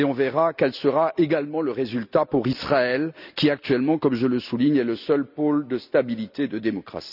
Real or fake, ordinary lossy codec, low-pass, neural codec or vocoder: real; none; 5.4 kHz; none